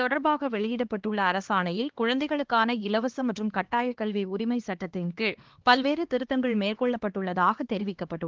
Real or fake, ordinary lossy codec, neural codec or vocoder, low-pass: fake; Opus, 16 kbps; codec, 16 kHz, 2 kbps, X-Codec, HuBERT features, trained on LibriSpeech; 7.2 kHz